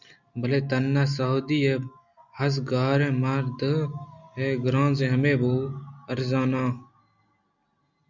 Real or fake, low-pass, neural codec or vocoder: real; 7.2 kHz; none